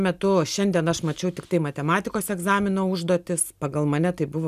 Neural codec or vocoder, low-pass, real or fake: none; 14.4 kHz; real